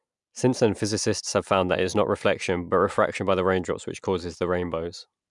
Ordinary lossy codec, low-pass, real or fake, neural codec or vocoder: MP3, 96 kbps; 10.8 kHz; real; none